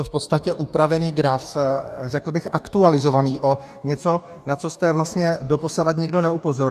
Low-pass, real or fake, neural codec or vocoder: 14.4 kHz; fake; codec, 44.1 kHz, 2.6 kbps, DAC